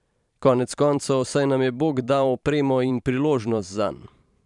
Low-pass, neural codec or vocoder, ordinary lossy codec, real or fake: 10.8 kHz; vocoder, 44.1 kHz, 128 mel bands every 512 samples, BigVGAN v2; none; fake